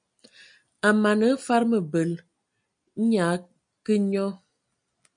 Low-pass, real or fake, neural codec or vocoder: 9.9 kHz; real; none